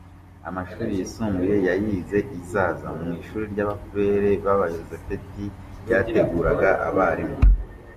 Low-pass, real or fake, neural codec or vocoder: 14.4 kHz; real; none